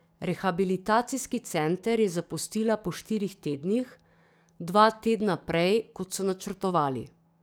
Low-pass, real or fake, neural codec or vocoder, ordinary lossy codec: none; fake; codec, 44.1 kHz, 7.8 kbps, DAC; none